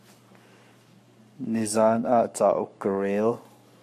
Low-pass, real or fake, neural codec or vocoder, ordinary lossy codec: 14.4 kHz; fake; codec, 44.1 kHz, 7.8 kbps, Pupu-Codec; MP3, 96 kbps